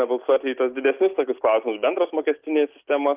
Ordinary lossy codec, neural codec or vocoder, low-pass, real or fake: Opus, 24 kbps; none; 3.6 kHz; real